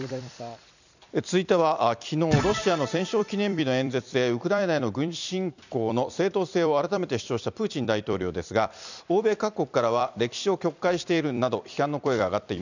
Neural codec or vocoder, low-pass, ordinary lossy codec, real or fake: vocoder, 44.1 kHz, 128 mel bands every 256 samples, BigVGAN v2; 7.2 kHz; none; fake